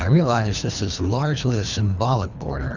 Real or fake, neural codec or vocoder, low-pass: fake; codec, 24 kHz, 3 kbps, HILCodec; 7.2 kHz